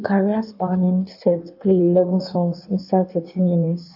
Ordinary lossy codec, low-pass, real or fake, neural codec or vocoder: AAC, 48 kbps; 5.4 kHz; fake; codec, 16 kHz in and 24 kHz out, 1.1 kbps, FireRedTTS-2 codec